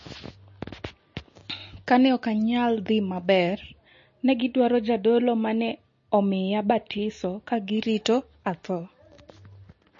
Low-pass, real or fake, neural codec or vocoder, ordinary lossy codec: 7.2 kHz; real; none; MP3, 32 kbps